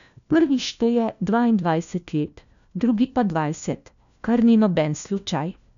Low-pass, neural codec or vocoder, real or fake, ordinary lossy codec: 7.2 kHz; codec, 16 kHz, 1 kbps, FunCodec, trained on LibriTTS, 50 frames a second; fake; none